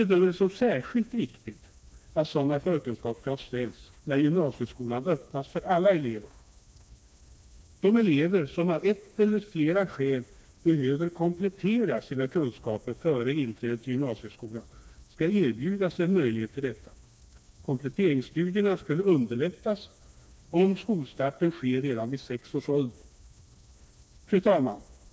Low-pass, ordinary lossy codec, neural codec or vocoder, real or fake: none; none; codec, 16 kHz, 2 kbps, FreqCodec, smaller model; fake